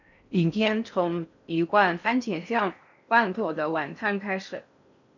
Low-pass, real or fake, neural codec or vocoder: 7.2 kHz; fake; codec, 16 kHz in and 24 kHz out, 0.6 kbps, FocalCodec, streaming, 4096 codes